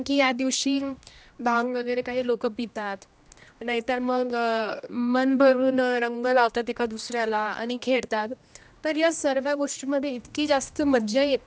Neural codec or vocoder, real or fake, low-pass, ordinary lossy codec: codec, 16 kHz, 1 kbps, X-Codec, HuBERT features, trained on general audio; fake; none; none